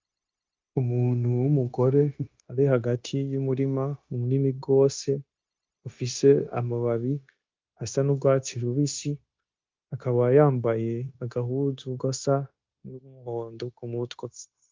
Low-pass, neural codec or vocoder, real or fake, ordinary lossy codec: 7.2 kHz; codec, 16 kHz, 0.9 kbps, LongCat-Audio-Codec; fake; Opus, 24 kbps